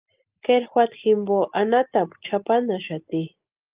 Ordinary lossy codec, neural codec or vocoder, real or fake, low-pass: Opus, 32 kbps; none; real; 3.6 kHz